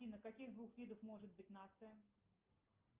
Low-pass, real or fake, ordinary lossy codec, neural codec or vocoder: 3.6 kHz; real; Opus, 32 kbps; none